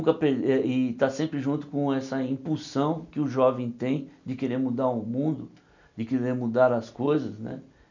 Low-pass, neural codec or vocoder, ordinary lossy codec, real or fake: 7.2 kHz; none; none; real